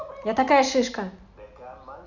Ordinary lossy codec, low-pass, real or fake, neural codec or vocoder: none; 7.2 kHz; real; none